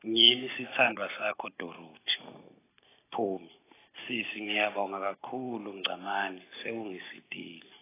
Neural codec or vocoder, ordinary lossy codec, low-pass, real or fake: none; AAC, 16 kbps; 3.6 kHz; real